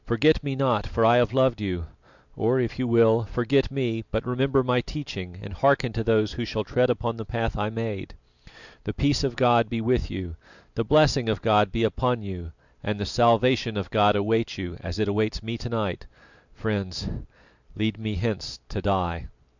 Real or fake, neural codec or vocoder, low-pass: real; none; 7.2 kHz